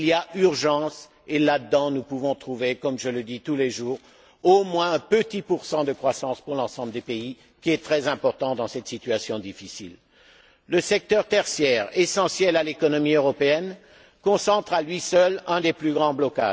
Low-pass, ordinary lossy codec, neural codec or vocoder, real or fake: none; none; none; real